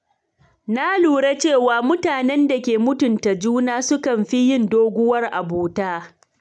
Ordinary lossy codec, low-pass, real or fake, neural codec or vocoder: none; none; real; none